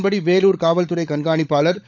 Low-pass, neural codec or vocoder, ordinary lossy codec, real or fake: 7.2 kHz; codec, 16 kHz, 16 kbps, FunCodec, trained on LibriTTS, 50 frames a second; none; fake